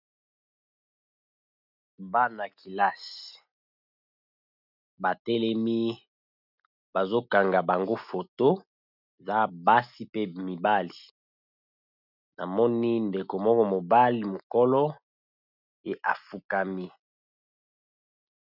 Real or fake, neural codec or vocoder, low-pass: real; none; 5.4 kHz